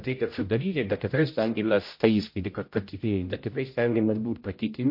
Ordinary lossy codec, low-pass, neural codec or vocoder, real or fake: MP3, 32 kbps; 5.4 kHz; codec, 16 kHz, 0.5 kbps, X-Codec, HuBERT features, trained on general audio; fake